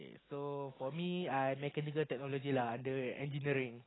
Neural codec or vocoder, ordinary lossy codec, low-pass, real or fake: none; AAC, 16 kbps; 7.2 kHz; real